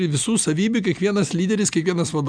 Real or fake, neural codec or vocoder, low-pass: real; none; 9.9 kHz